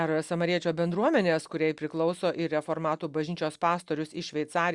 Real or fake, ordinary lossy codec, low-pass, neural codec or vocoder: real; Opus, 64 kbps; 10.8 kHz; none